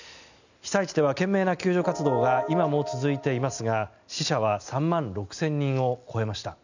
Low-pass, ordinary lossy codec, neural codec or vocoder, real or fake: 7.2 kHz; none; none; real